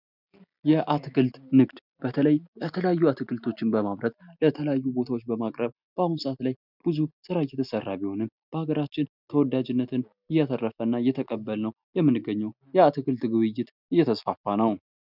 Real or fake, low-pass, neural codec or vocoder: real; 5.4 kHz; none